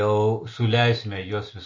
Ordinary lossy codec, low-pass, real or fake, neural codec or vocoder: MP3, 32 kbps; 7.2 kHz; real; none